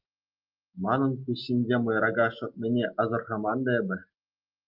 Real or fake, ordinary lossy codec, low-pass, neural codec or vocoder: real; Opus, 24 kbps; 5.4 kHz; none